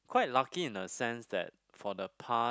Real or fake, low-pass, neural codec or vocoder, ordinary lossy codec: real; none; none; none